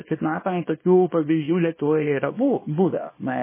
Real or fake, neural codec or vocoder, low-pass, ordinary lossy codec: fake; codec, 24 kHz, 0.9 kbps, WavTokenizer, small release; 3.6 kHz; MP3, 16 kbps